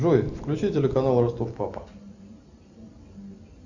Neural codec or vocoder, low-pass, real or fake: none; 7.2 kHz; real